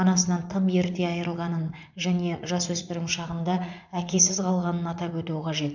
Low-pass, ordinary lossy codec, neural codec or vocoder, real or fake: 7.2 kHz; none; vocoder, 44.1 kHz, 80 mel bands, Vocos; fake